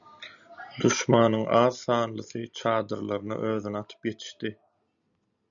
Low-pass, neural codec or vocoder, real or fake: 7.2 kHz; none; real